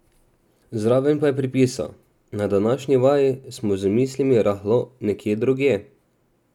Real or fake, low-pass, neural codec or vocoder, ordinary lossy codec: real; 19.8 kHz; none; none